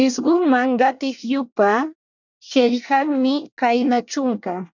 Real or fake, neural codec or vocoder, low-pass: fake; codec, 24 kHz, 1 kbps, SNAC; 7.2 kHz